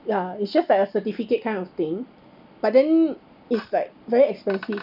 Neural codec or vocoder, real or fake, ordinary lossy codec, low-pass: none; real; none; 5.4 kHz